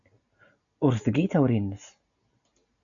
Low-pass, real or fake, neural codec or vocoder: 7.2 kHz; real; none